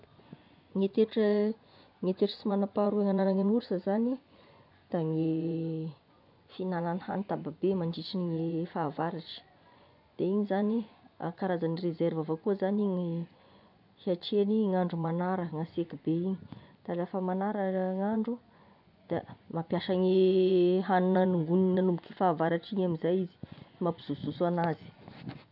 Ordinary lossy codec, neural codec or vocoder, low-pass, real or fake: none; vocoder, 24 kHz, 100 mel bands, Vocos; 5.4 kHz; fake